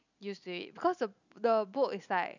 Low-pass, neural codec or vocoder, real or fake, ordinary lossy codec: 7.2 kHz; none; real; none